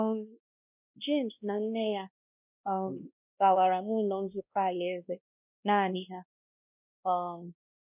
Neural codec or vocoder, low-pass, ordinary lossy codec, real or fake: codec, 16 kHz, 1 kbps, X-Codec, WavLM features, trained on Multilingual LibriSpeech; 3.6 kHz; none; fake